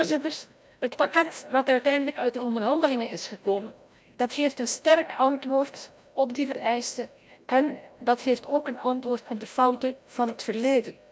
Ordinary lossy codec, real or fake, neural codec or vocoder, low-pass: none; fake; codec, 16 kHz, 0.5 kbps, FreqCodec, larger model; none